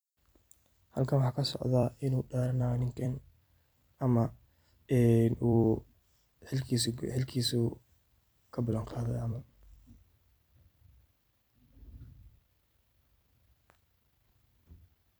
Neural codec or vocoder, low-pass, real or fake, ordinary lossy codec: vocoder, 44.1 kHz, 128 mel bands every 256 samples, BigVGAN v2; none; fake; none